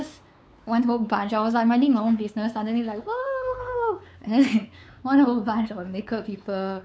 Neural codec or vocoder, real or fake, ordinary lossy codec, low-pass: codec, 16 kHz, 4 kbps, X-Codec, WavLM features, trained on Multilingual LibriSpeech; fake; none; none